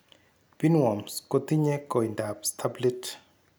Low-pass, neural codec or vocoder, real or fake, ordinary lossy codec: none; none; real; none